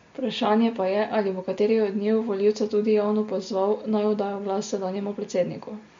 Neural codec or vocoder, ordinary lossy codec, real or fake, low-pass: none; MP3, 48 kbps; real; 7.2 kHz